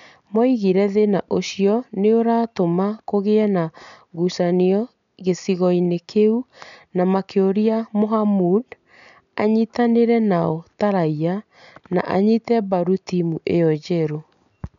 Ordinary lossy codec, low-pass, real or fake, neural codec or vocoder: MP3, 96 kbps; 7.2 kHz; real; none